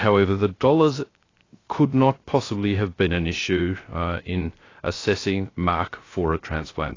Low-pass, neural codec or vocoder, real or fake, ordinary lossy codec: 7.2 kHz; codec, 16 kHz, 0.3 kbps, FocalCodec; fake; AAC, 32 kbps